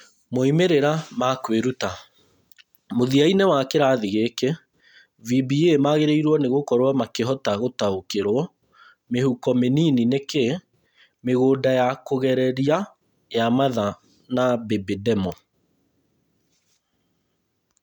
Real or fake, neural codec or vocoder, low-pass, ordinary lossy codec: real; none; 19.8 kHz; none